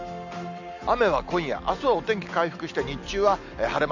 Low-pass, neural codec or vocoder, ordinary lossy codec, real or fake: 7.2 kHz; none; none; real